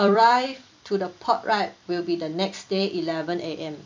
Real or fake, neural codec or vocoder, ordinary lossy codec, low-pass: fake; vocoder, 44.1 kHz, 128 mel bands every 256 samples, BigVGAN v2; MP3, 64 kbps; 7.2 kHz